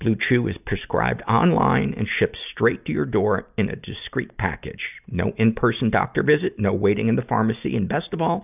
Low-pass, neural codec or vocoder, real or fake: 3.6 kHz; none; real